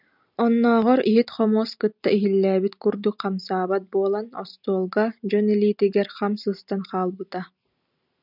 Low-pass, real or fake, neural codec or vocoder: 5.4 kHz; real; none